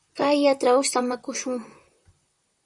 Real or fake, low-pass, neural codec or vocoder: fake; 10.8 kHz; vocoder, 44.1 kHz, 128 mel bands, Pupu-Vocoder